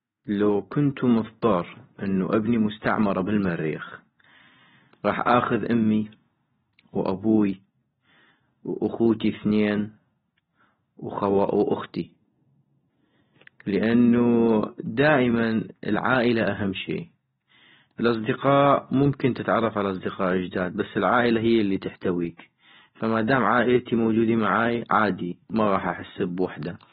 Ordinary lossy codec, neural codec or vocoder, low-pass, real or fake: AAC, 16 kbps; none; 10.8 kHz; real